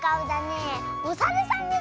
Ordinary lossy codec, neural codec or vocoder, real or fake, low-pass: none; none; real; none